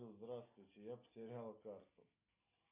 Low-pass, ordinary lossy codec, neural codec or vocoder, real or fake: 3.6 kHz; MP3, 32 kbps; none; real